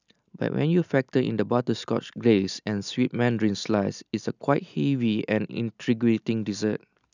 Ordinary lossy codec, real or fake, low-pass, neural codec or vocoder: none; real; 7.2 kHz; none